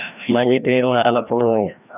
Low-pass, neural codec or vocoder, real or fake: 3.6 kHz; codec, 16 kHz, 1 kbps, FreqCodec, larger model; fake